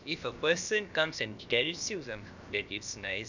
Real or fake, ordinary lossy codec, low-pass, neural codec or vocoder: fake; none; 7.2 kHz; codec, 16 kHz, about 1 kbps, DyCAST, with the encoder's durations